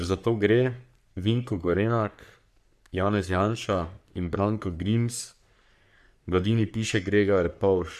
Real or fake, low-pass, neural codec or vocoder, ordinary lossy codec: fake; 14.4 kHz; codec, 44.1 kHz, 3.4 kbps, Pupu-Codec; MP3, 96 kbps